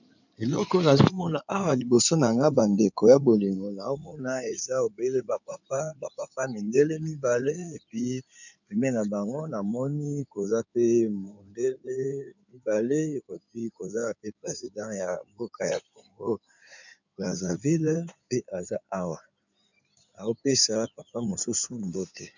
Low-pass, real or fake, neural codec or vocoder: 7.2 kHz; fake; codec, 16 kHz in and 24 kHz out, 2.2 kbps, FireRedTTS-2 codec